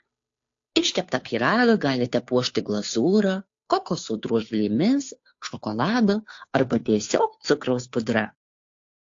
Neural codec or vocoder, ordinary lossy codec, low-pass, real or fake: codec, 16 kHz, 2 kbps, FunCodec, trained on Chinese and English, 25 frames a second; AAC, 48 kbps; 7.2 kHz; fake